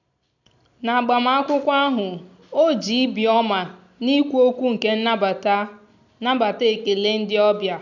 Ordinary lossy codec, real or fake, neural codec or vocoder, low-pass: none; real; none; 7.2 kHz